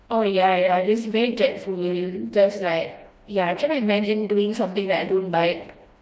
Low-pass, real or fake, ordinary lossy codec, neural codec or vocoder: none; fake; none; codec, 16 kHz, 1 kbps, FreqCodec, smaller model